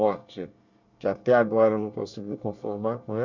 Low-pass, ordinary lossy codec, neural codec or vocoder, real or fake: 7.2 kHz; Opus, 64 kbps; codec, 24 kHz, 1 kbps, SNAC; fake